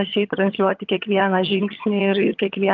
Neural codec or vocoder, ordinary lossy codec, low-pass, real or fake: vocoder, 22.05 kHz, 80 mel bands, HiFi-GAN; Opus, 32 kbps; 7.2 kHz; fake